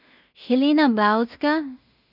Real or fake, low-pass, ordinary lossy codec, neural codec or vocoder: fake; 5.4 kHz; none; codec, 16 kHz in and 24 kHz out, 0.4 kbps, LongCat-Audio-Codec, two codebook decoder